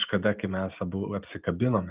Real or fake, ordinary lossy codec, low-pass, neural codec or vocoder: real; Opus, 24 kbps; 3.6 kHz; none